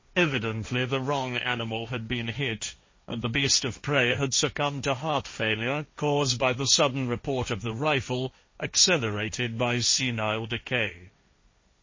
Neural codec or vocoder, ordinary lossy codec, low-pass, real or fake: codec, 16 kHz, 1.1 kbps, Voila-Tokenizer; MP3, 32 kbps; 7.2 kHz; fake